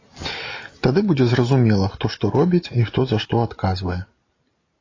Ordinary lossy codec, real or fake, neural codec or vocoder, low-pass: AAC, 32 kbps; real; none; 7.2 kHz